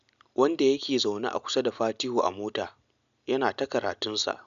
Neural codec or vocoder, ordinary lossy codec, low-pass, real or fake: none; MP3, 96 kbps; 7.2 kHz; real